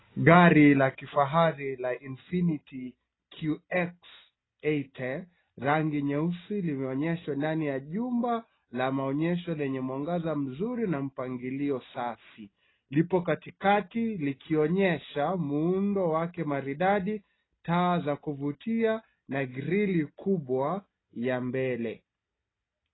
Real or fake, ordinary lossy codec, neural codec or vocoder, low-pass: real; AAC, 16 kbps; none; 7.2 kHz